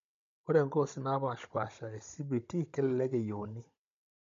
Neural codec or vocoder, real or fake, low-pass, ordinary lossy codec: codec, 16 kHz, 16 kbps, FunCodec, trained on Chinese and English, 50 frames a second; fake; 7.2 kHz; MP3, 48 kbps